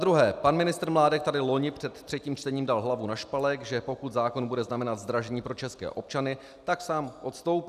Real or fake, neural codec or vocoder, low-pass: real; none; 14.4 kHz